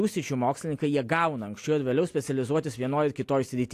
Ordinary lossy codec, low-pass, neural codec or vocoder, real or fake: AAC, 64 kbps; 14.4 kHz; none; real